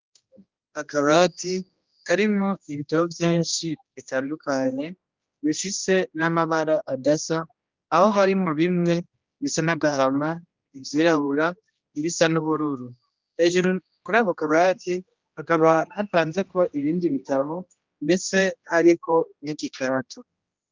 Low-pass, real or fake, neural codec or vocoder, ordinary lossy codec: 7.2 kHz; fake; codec, 16 kHz, 1 kbps, X-Codec, HuBERT features, trained on general audio; Opus, 24 kbps